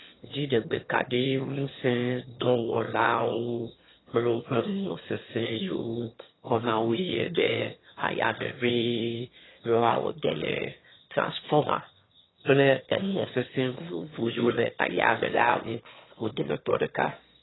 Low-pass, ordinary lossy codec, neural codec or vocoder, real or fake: 7.2 kHz; AAC, 16 kbps; autoencoder, 22.05 kHz, a latent of 192 numbers a frame, VITS, trained on one speaker; fake